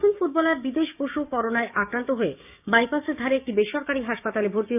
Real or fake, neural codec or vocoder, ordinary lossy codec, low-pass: fake; codec, 16 kHz, 6 kbps, DAC; none; 3.6 kHz